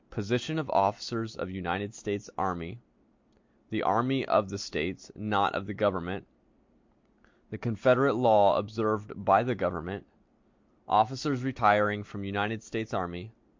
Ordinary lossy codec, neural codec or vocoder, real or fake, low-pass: MP3, 48 kbps; none; real; 7.2 kHz